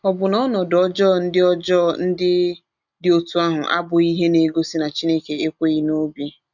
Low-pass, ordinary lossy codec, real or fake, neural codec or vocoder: 7.2 kHz; none; real; none